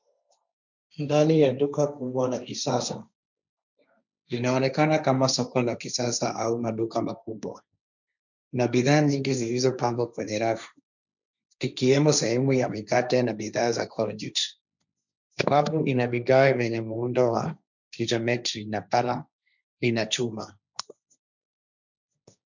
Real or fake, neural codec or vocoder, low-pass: fake; codec, 16 kHz, 1.1 kbps, Voila-Tokenizer; 7.2 kHz